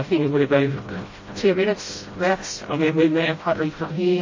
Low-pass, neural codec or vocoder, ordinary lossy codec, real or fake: 7.2 kHz; codec, 16 kHz, 0.5 kbps, FreqCodec, smaller model; MP3, 32 kbps; fake